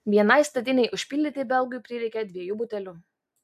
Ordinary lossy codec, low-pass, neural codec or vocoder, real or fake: AAC, 96 kbps; 14.4 kHz; none; real